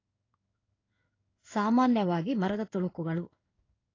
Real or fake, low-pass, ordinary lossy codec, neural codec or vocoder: fake; 7.2 kHz; AAC, 32 kbps; codec, 16 kHz in and 24 kHz out, 1 kbps, XY-Tokenizer